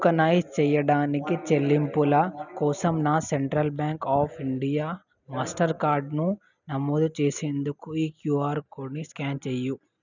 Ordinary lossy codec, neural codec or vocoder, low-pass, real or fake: none; none; 7.2 kHz; real